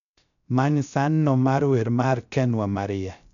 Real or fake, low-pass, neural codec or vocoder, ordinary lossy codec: fake; 7.2 kHz; codec, 16 kHz, 0.3 kbps, FocalCodec; none